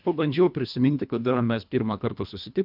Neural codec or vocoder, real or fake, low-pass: codec, 24 kHz, 1.5 kbps, HILCodec; fake; 5.4 kHz